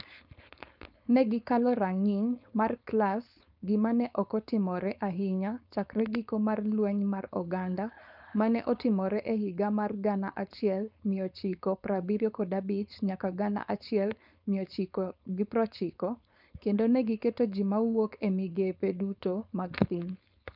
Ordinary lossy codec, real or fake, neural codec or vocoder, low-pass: none; fake; codec, 16 kHz, 4.8 kbps, FACodec; 5.4 kHz